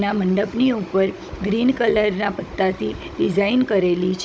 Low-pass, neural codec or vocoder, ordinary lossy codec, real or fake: none; codec, 16 kHz, 16 kbps, FunCodec, trained on Chinese and English, 50 frames a second; none; fake